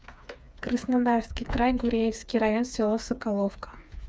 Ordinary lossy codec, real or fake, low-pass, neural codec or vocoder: none; fake; none; codec, 16 kHz, 4 kbps, FreqCodec, smaller model